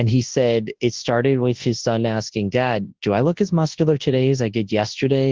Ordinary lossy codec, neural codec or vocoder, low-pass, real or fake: Opus, 16 kbps; codec, 24 kHz, 0.9 kbps, WavTokenizer, large speech release; 7.2 kHz; fake